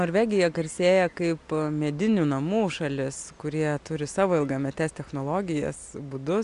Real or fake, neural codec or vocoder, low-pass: real; none; 10.8 kHz